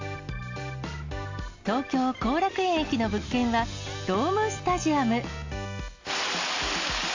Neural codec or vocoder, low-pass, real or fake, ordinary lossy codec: none; 7.2 kHz; real; AAC, 48 kbps